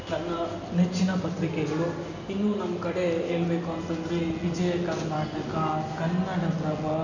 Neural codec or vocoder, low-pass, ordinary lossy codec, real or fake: none; 7.2 kHz; none; real